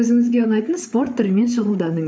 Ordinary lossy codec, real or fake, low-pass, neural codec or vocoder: none; fake; none; codec, 16 kHz, 8 kbps, FreqCodec, larger model